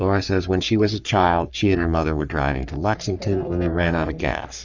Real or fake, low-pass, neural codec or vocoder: fake; 7.2 kHz; codec, 44.1 kHz, 3.4 kbps, Pupu-Codec